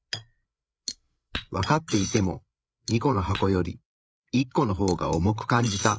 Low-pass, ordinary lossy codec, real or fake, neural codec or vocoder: none; none; fake; codec, 16 kHz, 8 kbps, FreqCodec, larger model